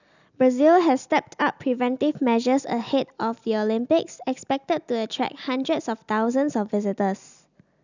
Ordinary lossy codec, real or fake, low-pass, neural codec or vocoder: none; real; 7.2 kHz; none